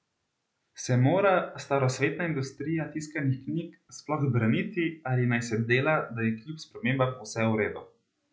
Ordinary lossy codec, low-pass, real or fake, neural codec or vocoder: none; none; real; none